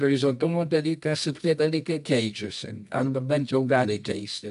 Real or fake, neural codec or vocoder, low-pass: fake; codec, 24 kHz, 0.9 kbps, WavTokenizer, medium music audio release; 10.8 kHz